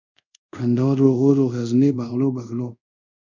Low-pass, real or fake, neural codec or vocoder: 7.2 kHz; fake; codec, 24 kHz, 0.5 kbps, DualCodec